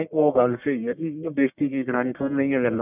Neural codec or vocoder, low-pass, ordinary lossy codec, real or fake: codec, 44.1 kHz, 1.7 kbps, Pupu-Codec; 3.6 kHz; none; fake